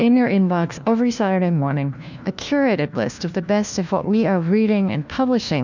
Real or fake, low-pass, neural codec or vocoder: fake; 7.2 kHz; codec, 16 kHz, 1 kbps, FunCodec, trained on LibriTTS, 50 frames a second